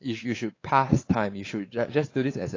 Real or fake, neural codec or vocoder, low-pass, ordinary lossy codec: fake; codec, 16 kHz, 4 kbps, X-Codec, WavLM features, trained on Multilingual LibriSpeech; 7.2 kHz; AAC, 32 kbps